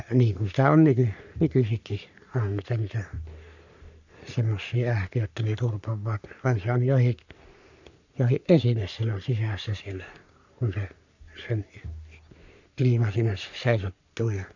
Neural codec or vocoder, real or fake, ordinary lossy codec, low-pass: codec, 44.1 kHz, 3.4 kbps, Pupu-Codec; fake; none; 7.2 kHz